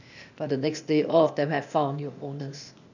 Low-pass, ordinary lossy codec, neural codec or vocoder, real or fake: 7.2 kHz; none; codec, 16 kHz, 0.8 kbps, ZipCodec; fake